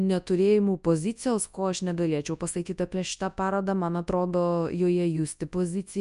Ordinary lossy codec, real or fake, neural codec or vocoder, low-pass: MP3, 96 kbps; fake; codec, 24 kHz, 0.9 kbps, WavTokenizer, large speech release; 10.8 kHz